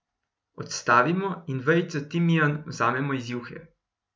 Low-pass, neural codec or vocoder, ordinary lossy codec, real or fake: none; none; none; real